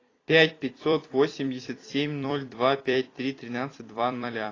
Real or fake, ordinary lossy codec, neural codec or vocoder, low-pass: fake; AAC, 32 kbps; vocoder, 44.1 kHz, 128 mel bands every 256 samples, BigVGAN v2; 7.2 kHz